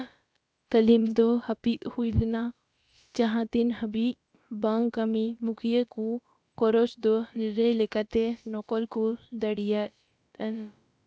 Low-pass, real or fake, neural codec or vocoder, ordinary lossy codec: none; fake; codec, 16 kHz, about 1 kbps, DyCAST, with the encoder's durations; none